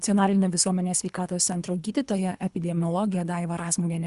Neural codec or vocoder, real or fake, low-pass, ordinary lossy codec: codec, 24 kHz, 3 kbps, HILCodec; fake; 10.8 kHz; Opus, 64 kbps